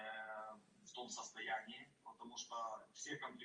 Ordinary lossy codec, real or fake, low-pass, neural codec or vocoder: Opus, 32 kbps; real; 9.9 kHz; none